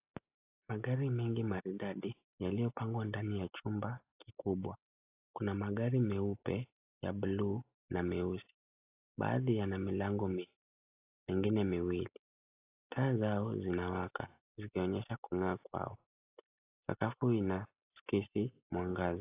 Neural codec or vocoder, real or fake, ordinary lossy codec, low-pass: none; real; AAC, 24 kbps; 3.6 kHz